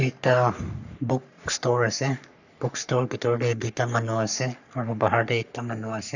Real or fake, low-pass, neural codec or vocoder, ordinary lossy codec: fake; 7.2 kHz; codec, 44.1 kHz, 3.4 kbps, Pupu-Codec; none